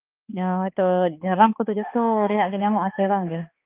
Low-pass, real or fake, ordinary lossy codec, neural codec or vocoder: 3.6 kHz; fake; Opus, 24 kbps; codec, 16 kHz, 2 kbps, X-Codec, HuBERT features, trained on balanced general audio